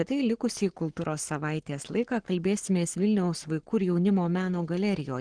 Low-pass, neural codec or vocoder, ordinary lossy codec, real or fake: 9.9 kHz; codec, 44.1 kHz, 7.8 kbps, Pupu-Codec; Opus, 16 kbps; fake